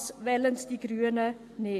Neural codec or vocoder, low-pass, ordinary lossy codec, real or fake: none; 14.4 kHz; AAC, 64 kbps; real